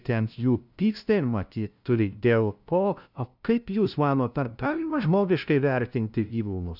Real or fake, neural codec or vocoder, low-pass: fake; codec, 16 kHz, 0.5 kbps, FunCodec, trained on LibriTTS, 25 frames a second; 5.4 kHz